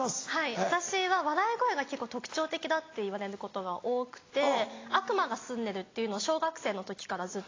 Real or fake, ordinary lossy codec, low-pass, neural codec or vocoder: real; AAC, 32 kbps; 7.2 kHz; none